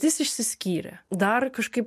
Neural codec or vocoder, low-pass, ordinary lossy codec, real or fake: none; 14.4 kHz; MP3, 64 kbps; real